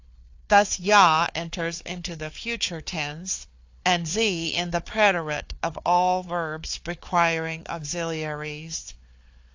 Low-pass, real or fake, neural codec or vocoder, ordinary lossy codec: 7.2 kHz; fake; codec, 16 kHz, 4 kbps, FunCodec, trained on Chinese and English, 50 frames a second; AAC, 48 kbps